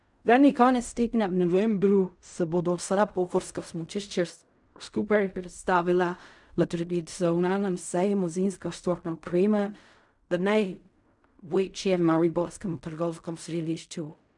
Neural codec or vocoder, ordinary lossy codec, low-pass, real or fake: codec, 16 kHz in and 24 kHz out, 0.4 kbps, LongCat-Audio-Codec, fine tuned four codebook decoder; MP3, 96 kbps; 10.8 kHz; fake